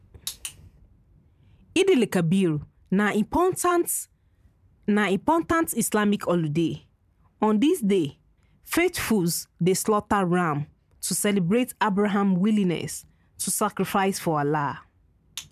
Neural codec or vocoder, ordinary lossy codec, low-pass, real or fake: vocoder, 44.1 kHz, 128 mel bands every 512 samples, BigVGAN v2; none; 14.4 kHz; fake